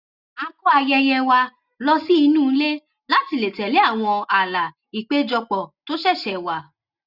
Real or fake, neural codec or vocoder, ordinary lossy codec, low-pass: real; none; Opus, 64 kbps; 5.4 kHz